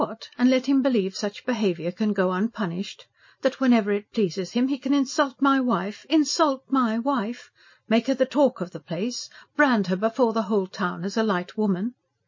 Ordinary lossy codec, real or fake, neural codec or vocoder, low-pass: MP3, 32 kbps; real; none; 7.2 kHz